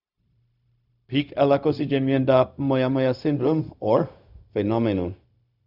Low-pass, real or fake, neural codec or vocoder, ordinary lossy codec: 5.4 kHz; fake; codec, 16 kHz, 0.4 kbps, LongCat-Audio-Codec; none